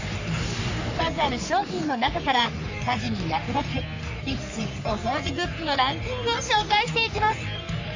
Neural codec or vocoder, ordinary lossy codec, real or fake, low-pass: codec, 44.1 kHz, 3.4 kbps, Pupu-Codec; AAC, 48 kbps; fake; 7.2 kHz